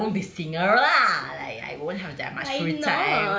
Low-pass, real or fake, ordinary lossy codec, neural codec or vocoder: none; real; none; none